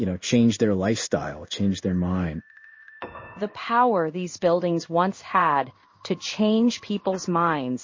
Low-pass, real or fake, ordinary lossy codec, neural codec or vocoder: 7.2 kHz; fake; MP3, 32 kbps; codec, 16 kHz in and 24 kHz out, 1 kbps, XY-Tokenizer